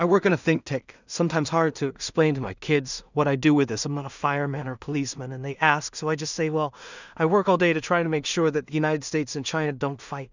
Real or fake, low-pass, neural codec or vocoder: fake; 7.2 kHz; codec, 16 kHz in and 24 kHz out, 0.4 kbps, LongCat-Audio-Codec, two codebook decoder